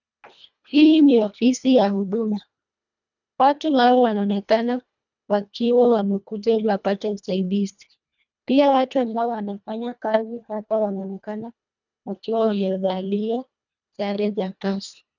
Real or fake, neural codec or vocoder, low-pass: fake; codec, 24 kHz, 1.5 kbps, HILCodec; 7.2 kHz